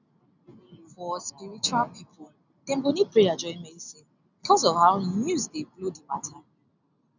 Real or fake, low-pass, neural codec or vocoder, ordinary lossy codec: real; 7.2 kHz; none; none